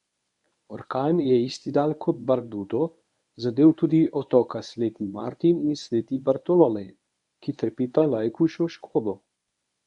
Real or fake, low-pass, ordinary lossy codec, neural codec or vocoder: fake; 10.8 kHz; none; codec, 24 kHz, 0.9 kbps, WavTokenizer, medium speech release version 1